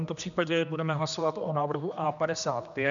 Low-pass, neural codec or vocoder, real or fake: 7.2 kHz; codec, 16 kHz, 2 kbps, X-Codec, HuBERT features, trained on general audio; fake